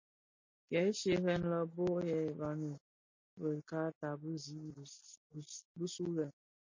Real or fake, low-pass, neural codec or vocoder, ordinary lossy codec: real; 7.2 kHz; none; MP3, 32 kbps